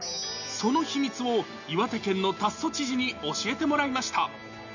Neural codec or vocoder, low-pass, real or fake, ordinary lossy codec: none; 7.2 kHz; real; none